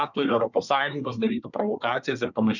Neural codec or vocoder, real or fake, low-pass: codec, 24 kHz, 1 kbps, SNAC; fake; 7.2 kHz